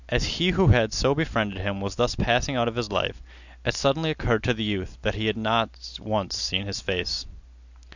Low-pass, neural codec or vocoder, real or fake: 7.2 kHz; none; real